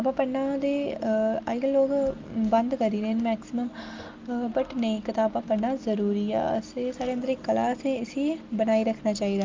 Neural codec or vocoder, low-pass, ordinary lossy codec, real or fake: none; 7.2 kHz; Opus, 32 kbps; real